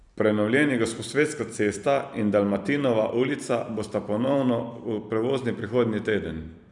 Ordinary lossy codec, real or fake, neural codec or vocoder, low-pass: none; fake; vocoder, 44.1 kHz, 128 mel bands every 256 samples, BigVGAN v2; 10.8 kHz